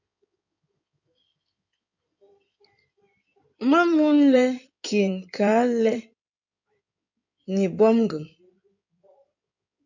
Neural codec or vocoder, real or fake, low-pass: codec, 16 kHz in and 24 kHz out, 2.2 kbps, FireRedTTS-2 codec; fake; 7.2 kHz